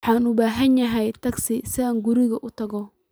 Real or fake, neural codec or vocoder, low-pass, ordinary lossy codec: real; none; none; none